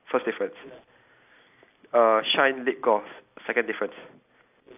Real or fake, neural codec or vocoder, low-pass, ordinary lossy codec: real; none; 3.6 kHz; AAC, 32 kbps